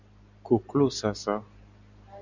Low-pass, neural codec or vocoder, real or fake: 7.2 kHz; none; real